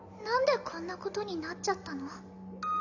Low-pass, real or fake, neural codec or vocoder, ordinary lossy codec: 7.2 kHz; real; none; none